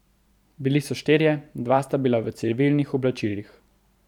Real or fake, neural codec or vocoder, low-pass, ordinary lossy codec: fake; vocoder, 44.1 kHz, 128 mel bands every 512 samples, BigVGAN v2; 19.8 kHz; none